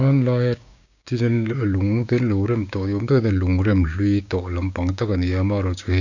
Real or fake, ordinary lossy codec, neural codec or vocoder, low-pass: fake; none; codec, 16 kHz, 6 kbps, DAC; 7.2 kHz